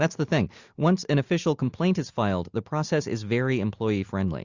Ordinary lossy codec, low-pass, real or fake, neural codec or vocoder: Opus, 64 kbps; 7.2 kHz; real; none